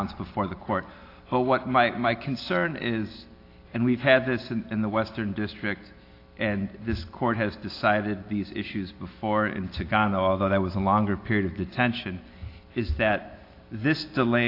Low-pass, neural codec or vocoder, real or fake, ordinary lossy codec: 5.4 kHz; none; real; AAC, 32 kbps